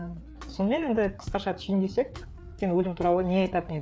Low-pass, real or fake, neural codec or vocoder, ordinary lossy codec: none; fake; codec, 16 kHz, 4 kbps, FreqCodec, larger model; none